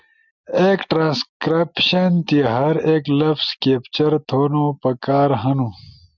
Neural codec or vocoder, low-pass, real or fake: none; 7.2 kHz; real